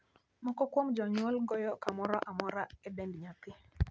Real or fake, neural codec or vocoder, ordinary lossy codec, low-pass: real; none; none; none